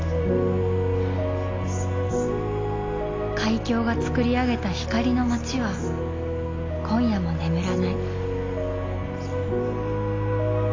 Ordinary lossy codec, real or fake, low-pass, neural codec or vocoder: AAC, 32 kbps; real; 7.2 kHz; none